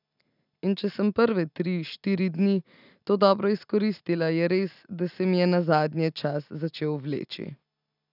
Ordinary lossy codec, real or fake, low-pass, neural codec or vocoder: none; real; 5.4 kHz; none